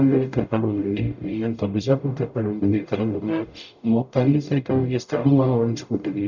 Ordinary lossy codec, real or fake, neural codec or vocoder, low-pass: none; fake; codec, 44.1 kHz, 0.9 kbps, DAC; 7.2 kHz